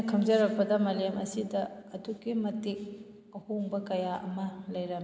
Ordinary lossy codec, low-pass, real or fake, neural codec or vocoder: none; none; real; none